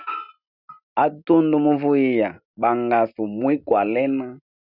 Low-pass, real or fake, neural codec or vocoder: 5.4 kHz; real; none